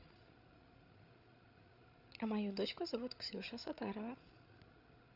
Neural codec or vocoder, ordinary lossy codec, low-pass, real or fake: codec, 16 kHz, 16 kbps, FreqCodec, larger model; none; 5.4 kHz; fake